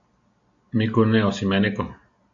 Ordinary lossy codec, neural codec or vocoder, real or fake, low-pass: AAC, 64 kbps; none; real; 7.2 kHz